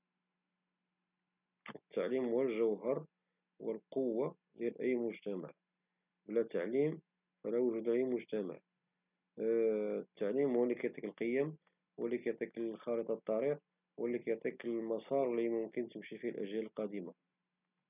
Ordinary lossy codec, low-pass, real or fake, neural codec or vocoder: none; 3.6 kHz; real; none